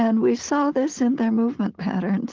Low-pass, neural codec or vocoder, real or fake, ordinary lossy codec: 7.2 kHz; none; real; Opus, 16 kbps